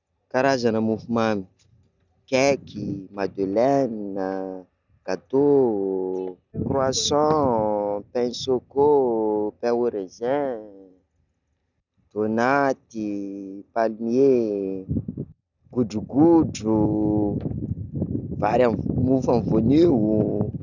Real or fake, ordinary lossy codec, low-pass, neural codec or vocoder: real; none; 7.2 kHz; none